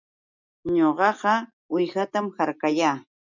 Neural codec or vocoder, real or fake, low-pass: none; real; 7.2 kHz